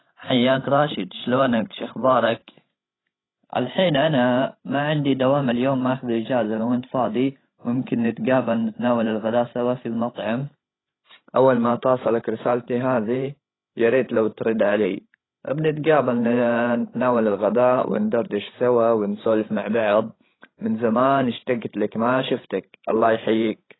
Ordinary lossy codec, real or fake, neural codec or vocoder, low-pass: AAC, 16 kbps; fake; codec, 16 kHz, 16 kbps, FreqCodec, larger model; 7.2 kHz